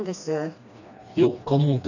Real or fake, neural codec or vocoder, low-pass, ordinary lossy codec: fake; codec, 16 kHz, 2 kbps, FreqCodec, smaller model; 7.2 kHz; none